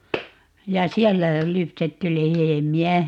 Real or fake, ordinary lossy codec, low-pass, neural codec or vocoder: real; none; 19.8 kHz; none